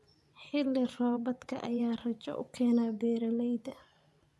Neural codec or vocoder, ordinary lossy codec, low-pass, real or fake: vocoder, 24 kHz, 100 mel bands, Vocos; none; none; fake